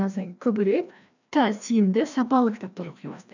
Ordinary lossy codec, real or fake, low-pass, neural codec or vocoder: none; fake; 7.2 kHz; codec, 16 kHz, 1 kbps, FreqCodec, larger model